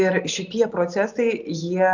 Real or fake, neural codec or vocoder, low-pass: real; none; 7.2 kHz